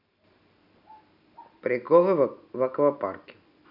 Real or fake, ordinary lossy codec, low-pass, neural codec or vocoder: real; none; 5.4 kHz; none